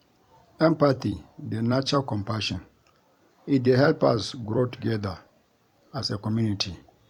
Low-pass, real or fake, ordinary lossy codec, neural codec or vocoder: 19.8 kHz; fake; none; vocoder, 44.1 kHz, 128 mel bands every 256 samples, BigVGAN v2